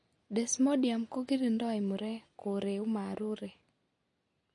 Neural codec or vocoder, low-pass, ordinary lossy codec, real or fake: none; 10.8 kHz; MP3, 48 kbps; real